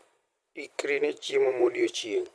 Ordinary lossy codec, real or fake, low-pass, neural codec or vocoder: none; fake; none; vocoder, 22.05 kHz, 80 mel bands, Vocos